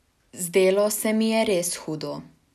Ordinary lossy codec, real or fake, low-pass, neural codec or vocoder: none; real; 14.4 kHz; none